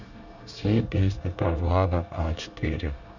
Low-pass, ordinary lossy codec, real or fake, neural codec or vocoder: 7.2 kHz; none; fake; codec, 24 kHz, 1 kbps, SNAC